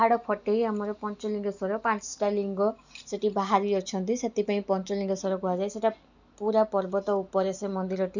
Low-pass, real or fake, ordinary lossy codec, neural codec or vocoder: 7.2 kHz; real; none; none